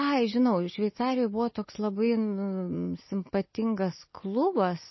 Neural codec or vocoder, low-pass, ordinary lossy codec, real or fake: none; 7.2 kHz; MP3, 24 kbps; real